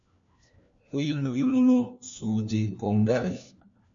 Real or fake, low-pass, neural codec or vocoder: fake; 7.2 kHz; codec, 16 kHz, 1 kbps, FunCodec, trained on LibriTTS, 50 frames a second